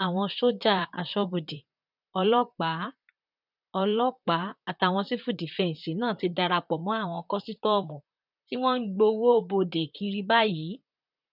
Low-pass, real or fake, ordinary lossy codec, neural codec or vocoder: 5.4 kHz; fake; none; vocoder, 44.1 kHz, 128 mel bands, Pupu-Vocoder